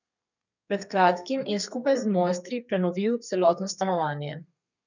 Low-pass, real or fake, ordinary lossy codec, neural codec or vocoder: 7.2 kHz; fake; none; codec, 32 kHz, 1.9 kbps, SNAC